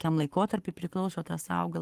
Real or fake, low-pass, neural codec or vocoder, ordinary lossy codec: fake; 14.4 kHz; codec, 44.1 kHz, 7.8 kbps, Pupu-Codec; Opus, 24 kbps